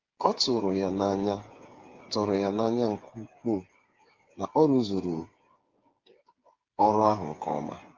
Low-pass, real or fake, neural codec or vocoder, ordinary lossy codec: 7.2 kHz; fake; codec, 16 kHz, 8 kbps, FreqCodec, smaller model; Opus, 32 kbps